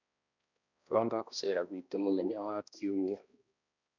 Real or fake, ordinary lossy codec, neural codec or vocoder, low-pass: fake; none; codec, 16 kHz, 1 kbps, X-Codec, HuBERT features, trained on balanced general audio; 7.2 kHz